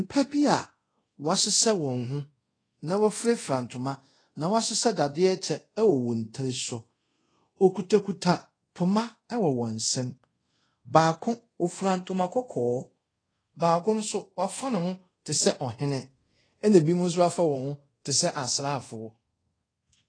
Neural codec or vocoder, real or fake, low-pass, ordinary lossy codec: codec, 24 kHz, 0.5 kbps, DualCodec; fake; 9.9 kHz; AAC, 32 kbps